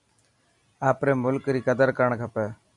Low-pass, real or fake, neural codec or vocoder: 10.8 kHz; real; none